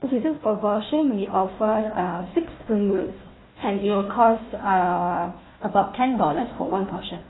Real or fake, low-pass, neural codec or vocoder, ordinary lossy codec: fake; 7.2 kHz; codec, 16 kHz, 1 kbps, FunCodec, trained on Chinese and English, 50 frames a second; AAC, 16 kbps